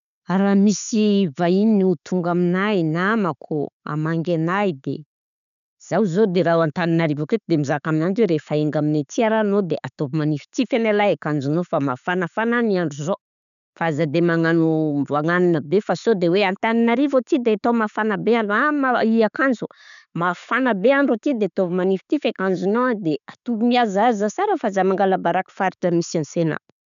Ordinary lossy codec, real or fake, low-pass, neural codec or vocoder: none; real; 7.2 kHz; none